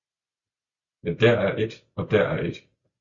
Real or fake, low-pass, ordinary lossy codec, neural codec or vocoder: real; 7.2 kHz; Opus, 64 kbps; none